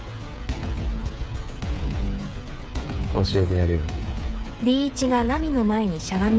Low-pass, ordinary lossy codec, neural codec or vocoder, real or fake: none; none; codec, 16 kHz, 8 kbps, FreqCodec, smaller model; fake